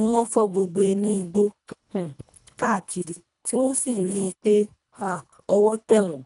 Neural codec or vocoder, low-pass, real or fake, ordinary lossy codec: codec, 24 kHz, 1.5 kbps, HILCodec; 10.8 kHz; fake; none